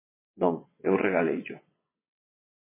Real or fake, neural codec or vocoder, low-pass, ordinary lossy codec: fake; vocoder, 22.05 kHz, 80 mel bands, WaveNeXt; 3.6 kHz; MP3, 16 kbps